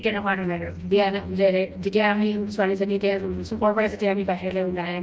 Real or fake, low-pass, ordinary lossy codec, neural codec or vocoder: fake; none; none; codec, 16 kHz, 1 kbps, FreqCodec, smaller model